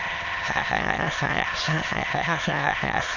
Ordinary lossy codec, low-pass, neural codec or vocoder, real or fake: none; 7.2 kHz; autoencoder, 22.05 kHz, a latent of 192 numbers a frame, VITS, trained on many speakers; fake